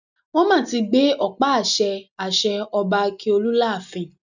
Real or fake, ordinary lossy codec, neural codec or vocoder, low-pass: real; none; none; 7.2 kHz